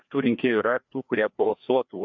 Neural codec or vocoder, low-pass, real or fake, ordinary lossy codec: codec, 16 kHz, 2 kbps, FreqCodec, larger model; 7.2 kHz; fake; MP3, 64 kbps